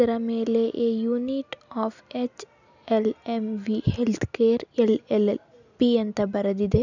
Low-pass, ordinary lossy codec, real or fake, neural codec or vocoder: 7.2 kHz; none; real; none